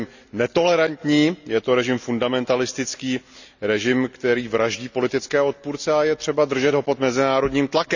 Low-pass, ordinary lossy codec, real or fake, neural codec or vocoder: 7.2 kHz; none; real; none